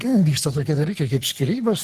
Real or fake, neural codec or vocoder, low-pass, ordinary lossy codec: fake; codec, 44.1 kHz, 3.4 kbps, Pupu-Codec; 14.4 kHz; Opus, 16 kbps